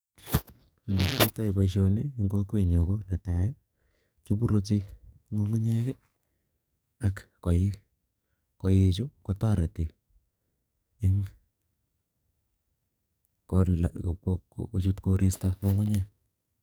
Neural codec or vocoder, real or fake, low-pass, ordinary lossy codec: codec, 44.1 kHz, 2.6 kbps, SNAC; fake; none; none